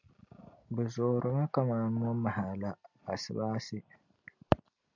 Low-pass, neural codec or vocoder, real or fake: 7.2 kHz; none; real